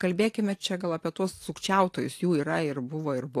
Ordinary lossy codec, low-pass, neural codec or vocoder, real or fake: AAC, 64 kbps; 14.4 kHz; vocoder, 44.1 kHz, 128 mel bands every 512 samples, BigVGAN v2; fake